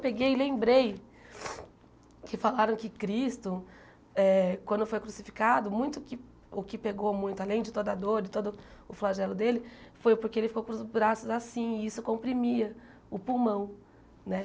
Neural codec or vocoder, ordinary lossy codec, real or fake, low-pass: none; none; real; none